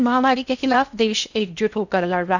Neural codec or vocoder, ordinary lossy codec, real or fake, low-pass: codec, 16 kHz in and 24 kHz out, 0.6 kbps, FocalCodec, streaming, 4096 codes; none; fake; 7.2 kHz